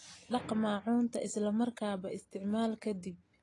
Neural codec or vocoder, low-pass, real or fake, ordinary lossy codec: none; 10.8 kHz; real; AAC, 32 kbps